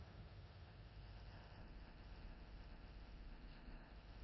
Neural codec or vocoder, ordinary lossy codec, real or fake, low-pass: codec, 16 kHz in and 24 kHz out, 0.4 kbps, LongCat-Audio-Codec, four codebook decoder; MP3, 24 kbps; fake; 7.2 kHz